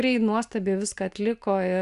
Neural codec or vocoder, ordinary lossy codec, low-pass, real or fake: none; AAC, 96 kbps; 10.8 kHz; real